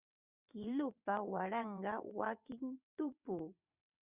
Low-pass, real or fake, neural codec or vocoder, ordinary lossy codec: 3.6 kHz; fake; vocoder, 22.05 kHz, 80 mel bands, WaveNeXt; Opus, 64 kbps